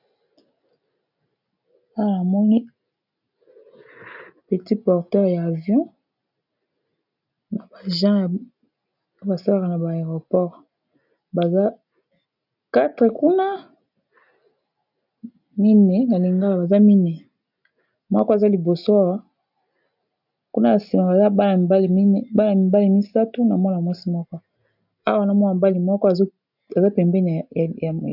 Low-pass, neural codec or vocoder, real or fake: 5.4 kHz; none; real